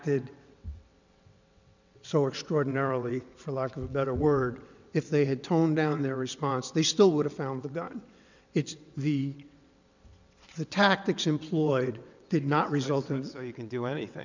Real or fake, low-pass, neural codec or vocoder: fake; 7.2 kHz; vocoder, 22.05 kHz, 80 mel bands, WaveNeXt